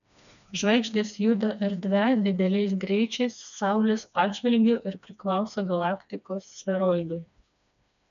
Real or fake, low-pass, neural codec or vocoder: fake; 7.2 kHz; codec, 16 kHz, 2 kbps, FreqCodec, smaller model